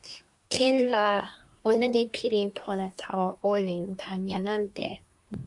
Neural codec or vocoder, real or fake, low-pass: codec, 24 kHz, 1 kbps, SNAC; fake; 10.8 kHz